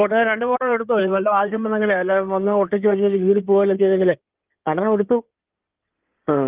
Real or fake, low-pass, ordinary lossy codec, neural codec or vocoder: fake; 3.6 kHz; Opus, 32 kbps; codec, 16 kHz in and 24 kHz out, 2.2 kbps, FireRedTTS-2 codec